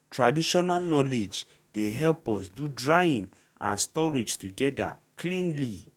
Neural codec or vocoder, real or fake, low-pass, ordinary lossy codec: codec, 44.1 kHz, 2.6 kbps, DAC; fake; 19.8 kHz; none